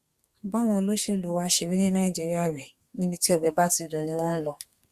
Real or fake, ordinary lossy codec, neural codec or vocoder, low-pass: fake; Opus, 64 kbps; codec, 32 kHz, 1.9 kbps, SNAC; 14.4 kHz